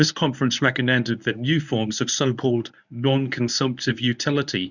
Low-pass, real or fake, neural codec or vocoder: 7.2 kHz; fake; codec, 24 kHz, 0.9 kbps, WavTokenizer, medium speech release version 1